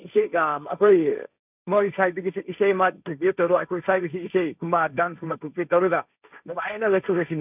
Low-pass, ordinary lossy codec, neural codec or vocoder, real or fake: 3.6 kHz; none; codec, 16 kHz, 1.1 kbps, Voila-Tokenizer; fake